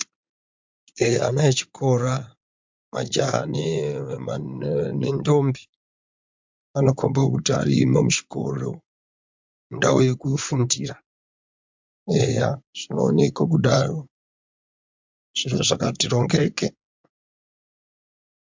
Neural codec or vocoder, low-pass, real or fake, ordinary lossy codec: vocoder, 44.1 kHz, 80 mel bands, Vocos; 7.2 kHz; fake; MP3, 64 kbps